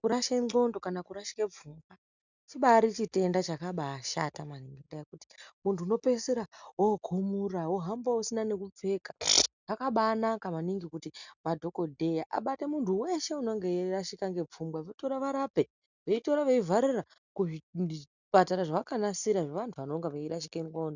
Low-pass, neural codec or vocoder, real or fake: 7.2 kHz; none; real